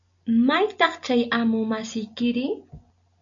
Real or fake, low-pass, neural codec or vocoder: real; 7.2 kHz; none